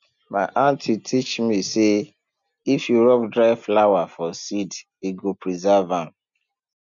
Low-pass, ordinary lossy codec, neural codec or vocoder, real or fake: 7.2 kHz; none; none; real